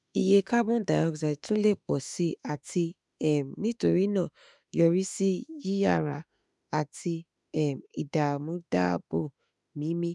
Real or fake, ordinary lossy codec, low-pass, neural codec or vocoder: fake; none; 10.8 kHz; autoencoder, 48 kHz, 32 numbers a frame, DAC-VAE, trained on Japanese speech